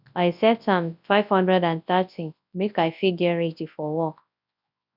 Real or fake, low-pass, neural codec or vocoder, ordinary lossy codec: fake; 5.4 kHz; codec, 24 kHz, 0.9 kbps, WavTokenizer, large speech release; none